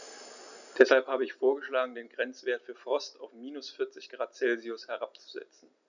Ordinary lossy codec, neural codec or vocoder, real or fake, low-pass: none; none; real; 7.2 kHz